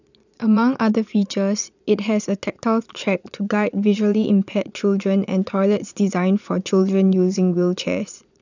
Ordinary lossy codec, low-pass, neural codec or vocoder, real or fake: none; 7.2 kHz; vocoder, 22.05 kHz, 80 mel bands, Vocos; fake